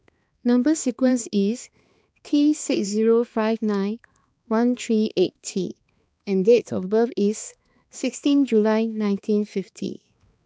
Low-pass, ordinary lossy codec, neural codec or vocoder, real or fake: none; none; codec, 16 kHz, 2 kbps, X-Codec, HuBERT features, trained on balanced general audio; fake